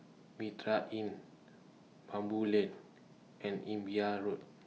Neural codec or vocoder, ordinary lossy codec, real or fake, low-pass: none; none; real; none